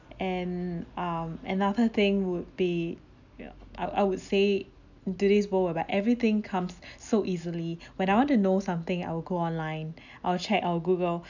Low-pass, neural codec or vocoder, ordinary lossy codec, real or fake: 7.2 kHz; none; none; real